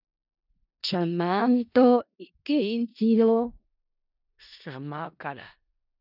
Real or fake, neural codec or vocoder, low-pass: fake; codec, 16 kHz in and 24 kHz out, 0.4 kbps, LongCat-Audio-Codec, four codebook decoder; 5.4 kHz